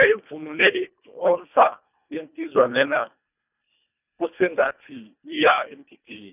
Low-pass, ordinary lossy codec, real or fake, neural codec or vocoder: 3.6 kHz; none; fake; codec, 24 kHz, 1.5 kbps, HILCodec